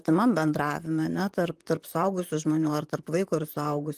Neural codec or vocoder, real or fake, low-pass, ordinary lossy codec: vocoder, 44.1 kHz, 128 mel bands, Pupu-Vocoder; fake; 14.4 kHz; Opus, 24 kbps